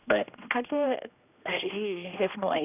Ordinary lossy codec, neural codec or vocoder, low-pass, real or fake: none; codec, 16 kHz, 1 kbps, X-Codec, HuBERT features, trained on general audio; 3.6 kHz; fake